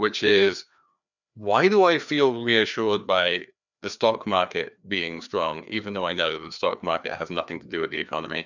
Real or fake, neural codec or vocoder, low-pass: fake; codec, 16 kHz, 2 kbps, FreqCodec, larger model; 7.2 kHz